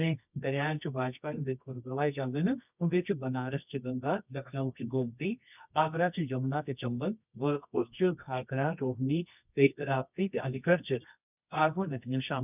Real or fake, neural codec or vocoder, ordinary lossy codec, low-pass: fake; codec, 24 kHz, 0.9 kbps, WavTokenizer, medium music audio release; none; 3.6 kHz